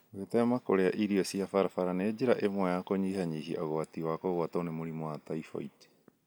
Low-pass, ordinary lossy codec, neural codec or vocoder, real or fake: none; none; none; real